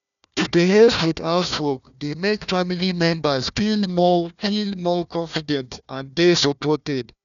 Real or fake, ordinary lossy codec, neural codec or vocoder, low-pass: fake; none; codec, 16 kHz, 1 kbps, FunCodec, trained on Chinese and English, 50 frames a second; 7.2 kHz